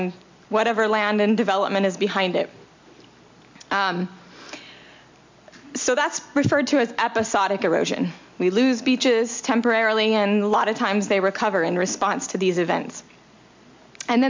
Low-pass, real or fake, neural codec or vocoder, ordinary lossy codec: 7.2 kHz; real; none; MP3, 64 kbps